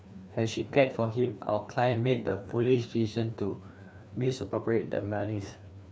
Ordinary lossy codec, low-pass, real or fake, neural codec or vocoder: none; none; fake; codec, 16 kHz, 2 kbps, FreqCodec, larger model